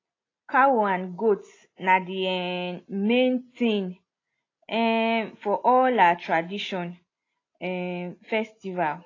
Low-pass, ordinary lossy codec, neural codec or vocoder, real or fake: 7.2 kHz; AAC, 32 kbps; none; real